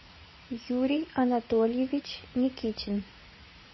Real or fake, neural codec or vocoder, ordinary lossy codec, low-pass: fake; vocoder, 44.1 kHz, 80 mel bands, Vocos; MP3, 24 kbps; 7.2 kHz